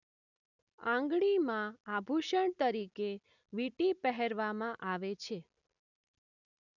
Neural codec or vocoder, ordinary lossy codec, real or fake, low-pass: none; none; real; 7.2 kHz